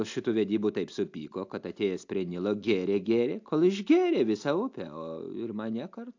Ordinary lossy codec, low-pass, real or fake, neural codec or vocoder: AAC, 48 kbps; 7.2 kHz; real; none